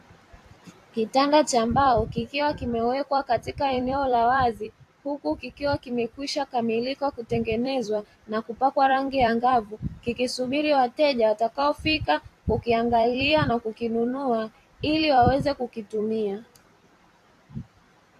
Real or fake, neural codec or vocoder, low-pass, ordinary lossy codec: fake; vocoder, 44.1 kHz, 128 mel bands every 256 samples, BigVGAN v2; 14.4 kHz; AAC, 64 kbps